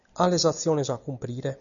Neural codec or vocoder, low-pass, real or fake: none; 7.2 kHz; real